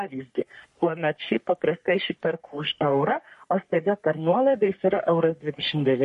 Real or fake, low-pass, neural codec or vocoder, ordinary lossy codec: fake; 14.4 kHz; codec, 44.1 kHz, 3.4 kbps, Pupu-Codec; MP3, 48 kbps